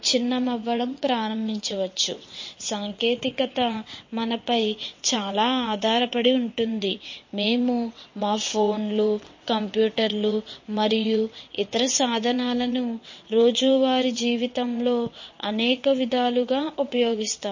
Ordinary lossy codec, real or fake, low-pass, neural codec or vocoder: MP3, 32 kbps; fake; 7.2 kHz; vocoder, 22.05 kHz, 80 mel bands, WaveNeXt